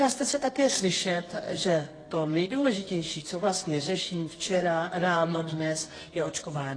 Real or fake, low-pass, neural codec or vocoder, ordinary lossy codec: fake; 9.9 kHz; codec, 24 kHz, 0.9 kbps, WavTokenizer, medium music audio release; AAC, 32 kbps